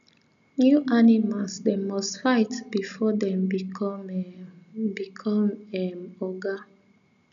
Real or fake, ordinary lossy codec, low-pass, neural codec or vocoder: real; MP3, 96 kbps; 7.2 kHz; none